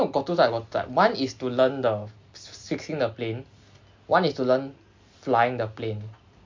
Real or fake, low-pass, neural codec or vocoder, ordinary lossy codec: real; 7.2 kHz; none; MP3, 48 kbps